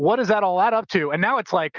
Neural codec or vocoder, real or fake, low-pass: none; real; 7.2 kHz